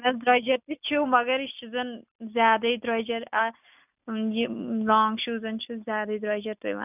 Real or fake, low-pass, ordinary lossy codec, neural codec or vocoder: real; 3.6 kHz; none; none